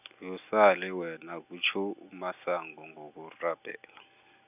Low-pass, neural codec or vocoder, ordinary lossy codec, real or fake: 3.6 kHz; none; none; real